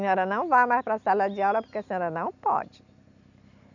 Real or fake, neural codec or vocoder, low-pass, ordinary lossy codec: real; none; 7.2 kHz; none